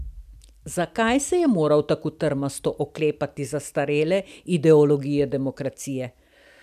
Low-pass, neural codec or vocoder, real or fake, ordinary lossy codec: 14.4 kHz; none; real; none